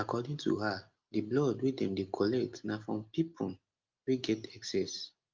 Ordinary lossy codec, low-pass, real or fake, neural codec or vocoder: Opus, 24 kbps; 7.2 kHz; real; none